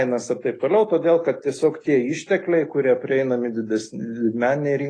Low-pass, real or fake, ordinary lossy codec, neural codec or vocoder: 9.9 kHz; real; AAC, 32 kbps; none